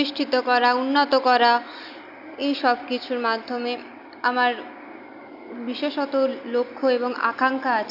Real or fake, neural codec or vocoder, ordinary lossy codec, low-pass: real; none; AAC, 48 kbps; 5.4 kHz